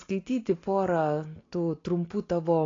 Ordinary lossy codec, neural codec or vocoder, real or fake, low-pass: AAC, 32 kbps; none; real; 7.2 kHz